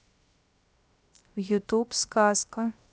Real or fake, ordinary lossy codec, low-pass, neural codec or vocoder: fake; none; none; codec, 16 kHz, 0.3 kbps, FocalCodec